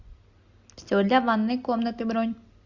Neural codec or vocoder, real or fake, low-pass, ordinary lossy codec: none; real; 7.2 kHz; Opus, 64 kbps